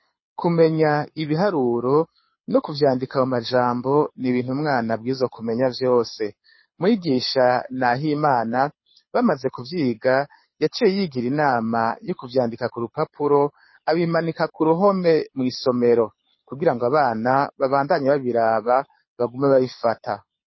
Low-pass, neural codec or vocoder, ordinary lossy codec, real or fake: 7.2 kHz; codec, 24 kHz, 6 kbps, HILCodec; MP3, 24 kbps; fake